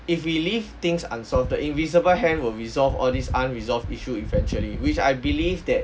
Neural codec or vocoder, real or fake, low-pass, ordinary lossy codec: none; real; none; none